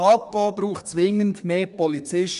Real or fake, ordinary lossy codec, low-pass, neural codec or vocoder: fake; none; 10.8 kHz; codec, 24 kHz, 1 kbps, SNAC